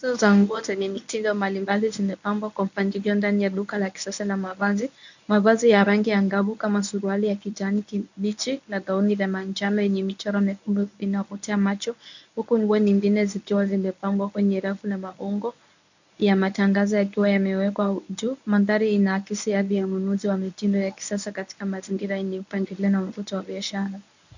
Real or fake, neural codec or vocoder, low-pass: fake; codec, 24 kHz, 0.9 kbps, WavTokenizer, medium speech release version 1; 7.2 kHz